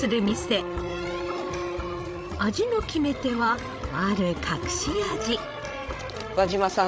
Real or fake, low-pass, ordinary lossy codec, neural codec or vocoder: fake; none; none; codec, 16 kHz, 16 kbps, FreqCodec, larger model